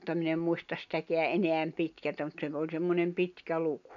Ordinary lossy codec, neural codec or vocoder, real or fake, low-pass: none; none; real; 7.2 kHz